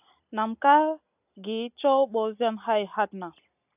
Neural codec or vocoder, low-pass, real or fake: none; 3.6 kHz; real